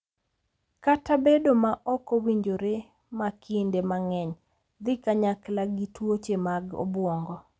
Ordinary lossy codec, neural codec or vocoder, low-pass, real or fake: none; none; none; real